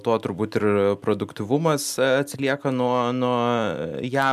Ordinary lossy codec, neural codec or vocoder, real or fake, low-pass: MP3, 96 kbps; none; real; 14.4 kHz